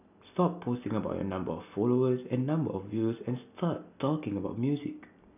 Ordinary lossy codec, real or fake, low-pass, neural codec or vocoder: none; real; 3.6 kHz; none